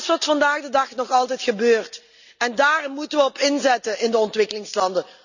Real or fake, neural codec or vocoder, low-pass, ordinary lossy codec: real; none; 7.2 kHz; none